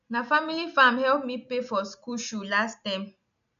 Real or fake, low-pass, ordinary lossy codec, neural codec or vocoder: real; 7.2 kHz; none; none